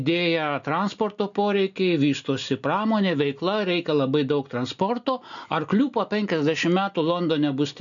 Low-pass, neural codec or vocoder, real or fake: 7.2 kHz; none; real